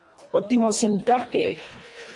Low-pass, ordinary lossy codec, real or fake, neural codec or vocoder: 10.8 kHz; MP3, 64 kbps; fake; codec, 24 kHz, 1.5 kbps, HILCodec